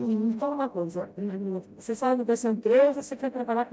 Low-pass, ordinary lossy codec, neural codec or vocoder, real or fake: none; none; codec, 16 kHz, 0.5 kbps, FreqCodec, smaller model; fake